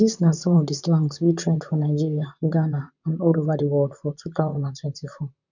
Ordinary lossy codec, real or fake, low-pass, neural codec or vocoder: none; fake; 7.2 kHz; vocoder, 44.1 kHz, 128 mel bands, Pupu-Vocoder